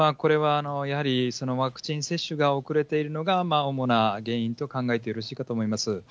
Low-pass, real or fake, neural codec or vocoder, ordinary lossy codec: none; real; none; none